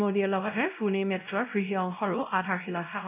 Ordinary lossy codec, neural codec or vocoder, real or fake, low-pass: none; codec, 16 kHz, 0.5 kbps, X-Codec, WavLM features, trained on Multilingual LibriSpeech; fake; 3.6 kHz